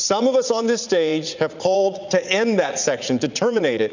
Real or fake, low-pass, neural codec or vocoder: fake; 7.2 kHz; vocoder, 44.1 kHz, 80 mel bands, Vocos